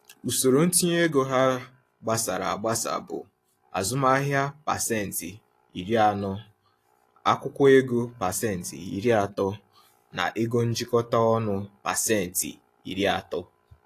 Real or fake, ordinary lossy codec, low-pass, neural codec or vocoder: real; AAC, 48 kbps; 14.4 kHz; none